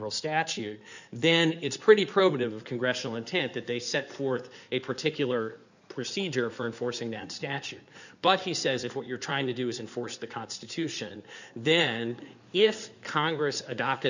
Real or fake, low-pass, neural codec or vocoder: fake; 7.2 kHz; codec, 16 kHz in and 24 kHz out, 2.2 kbps, FireRedTTS-2 codec